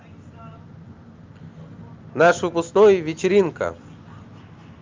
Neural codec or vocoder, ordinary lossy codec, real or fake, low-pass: none; Opus, 32 kbps; real; 7.2 kHz